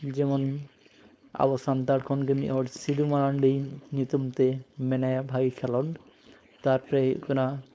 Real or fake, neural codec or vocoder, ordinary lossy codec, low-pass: fake; codec, 16 kHz, 4.8 kbps, FACodec; none; none